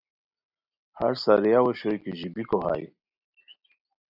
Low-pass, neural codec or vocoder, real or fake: 5.4 kHz; none; real